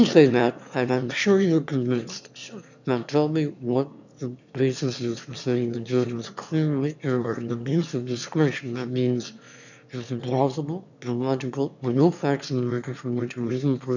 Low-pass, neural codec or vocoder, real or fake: 7.2 kHz; autoencoder, 22.05 kHz, a latent of 192 numbers a frame, VITS, trained on one speaker; fake